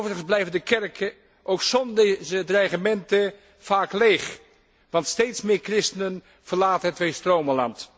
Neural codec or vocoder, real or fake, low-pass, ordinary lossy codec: none; real; none; none